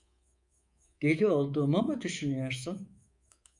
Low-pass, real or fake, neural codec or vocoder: 10.8 kHz; fake; codec, 24 kHz, 3.1 kbps, DualCodec